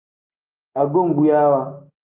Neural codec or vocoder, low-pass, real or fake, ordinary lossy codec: none; 3.6 kHz; real; Opus, 24 kbps